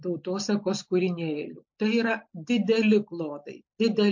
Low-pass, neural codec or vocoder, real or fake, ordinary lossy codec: 7.2 kHz; none; real; MP3, 48 kbps